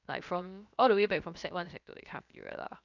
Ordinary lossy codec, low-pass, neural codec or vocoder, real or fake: none; 7.2 kHz; codec, 16 kHz, 0.7 kbps, FocalCodec; fake